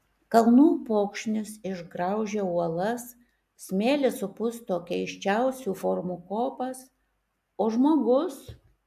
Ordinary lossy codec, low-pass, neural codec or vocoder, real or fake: AAC, 96 kbps; 14.4 kHz; none; real